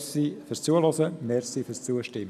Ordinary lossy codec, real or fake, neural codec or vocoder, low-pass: none; real; none; 14.4 kHz